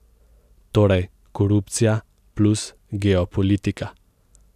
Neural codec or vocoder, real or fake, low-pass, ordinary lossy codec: none; real; 14.4 kHz; none